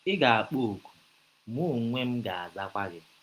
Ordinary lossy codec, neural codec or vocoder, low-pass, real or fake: Opus, 32 kbps; none; 14.4 kHz; real